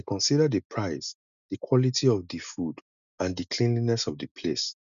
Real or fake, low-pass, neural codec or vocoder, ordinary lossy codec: real; 7.2 kHz; none; none